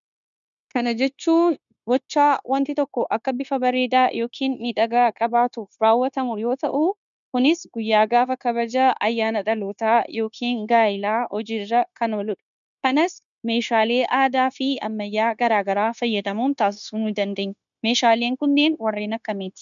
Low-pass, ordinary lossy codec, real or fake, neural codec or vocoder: 7.2 kHz; MP3, 96 kbps; fake; codec, 16 kHz, 0.9 kbps, LongCat-Audio-Codec